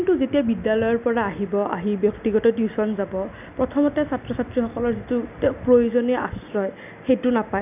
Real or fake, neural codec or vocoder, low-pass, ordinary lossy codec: real; none; 3.6 kHz; none